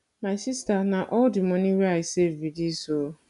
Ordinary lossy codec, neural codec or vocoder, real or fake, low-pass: none; none; real; 10.8 kHz